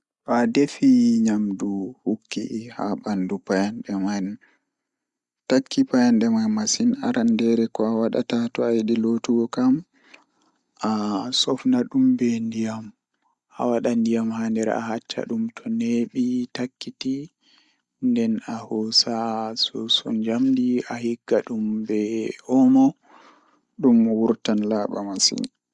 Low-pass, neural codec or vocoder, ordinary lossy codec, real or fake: 10.8 kHz; none; Opus, 64 kbps; real